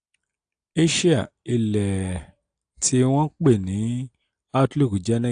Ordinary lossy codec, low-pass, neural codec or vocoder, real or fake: AAC, 64 kbps; 9.9 kHz; none; real